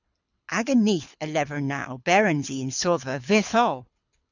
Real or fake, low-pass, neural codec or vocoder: fake; 7.2 kHz; codec, 24 kHz, 6 kbps, HILCodec